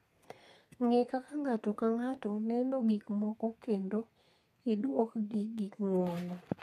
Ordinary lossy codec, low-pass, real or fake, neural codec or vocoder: MP3, 64 kbps; 14.4 kHz; fake; codec, 32 kHz, 1.9 kbps, SNAC